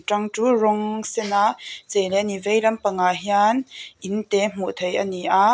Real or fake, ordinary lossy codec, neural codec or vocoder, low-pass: real; none; none; none